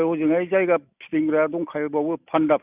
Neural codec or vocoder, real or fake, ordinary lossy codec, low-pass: none; real; none; 3.6 kHz